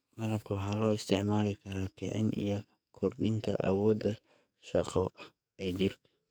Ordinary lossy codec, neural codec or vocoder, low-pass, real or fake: none; codec, 44.1 kHz, 2.6 kbps, SNAC; none; fake